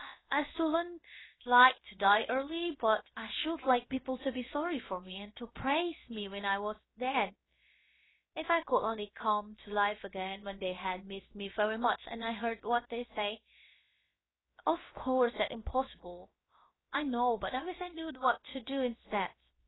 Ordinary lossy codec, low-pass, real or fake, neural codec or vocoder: AAC, 16 kbps; 7.2 kHz; fake; codec, 16 kHz, about 1 kbps, DyCAST, with the encoder's durations